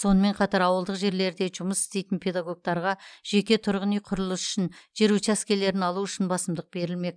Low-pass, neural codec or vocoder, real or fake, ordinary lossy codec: 9.9 kHz; none; real; none